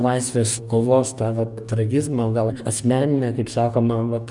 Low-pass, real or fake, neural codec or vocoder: 10.8 kHz; fake; codec, 44.1 kHz, 2.6 kbps, DAC